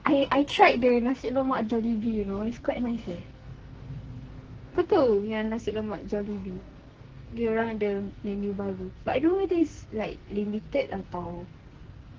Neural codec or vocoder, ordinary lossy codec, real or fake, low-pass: codec, 44.1 kHz, 2.6 kbps, SNAC; Opus, 16 kbps; fake; 7.2 kHz